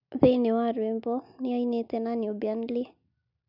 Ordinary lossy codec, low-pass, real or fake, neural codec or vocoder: none; 5.4 kHz; real; none